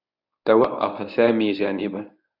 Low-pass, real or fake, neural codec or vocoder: 5.4 kHz; fake; codec, 24 kHz, 0.9 kbps, WavTokenizer, medium speech release version 1